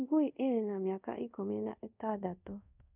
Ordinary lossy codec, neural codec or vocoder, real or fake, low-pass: none; codec, 24 kHz, 0.5 kbps, DualCodec; fake; 3.6 kHz